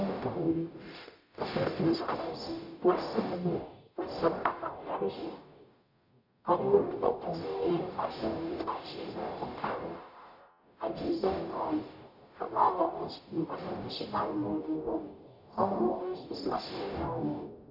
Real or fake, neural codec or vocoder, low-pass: fake; codec, 44.1 kHz, 0.9 kbps, DAC; 5.4 kHz